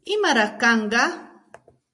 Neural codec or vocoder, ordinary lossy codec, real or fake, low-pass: none; MP3, 64 kbps; real; 10.8 kHz